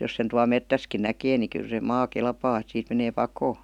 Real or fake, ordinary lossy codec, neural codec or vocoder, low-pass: real; none; none; 19.8 kHz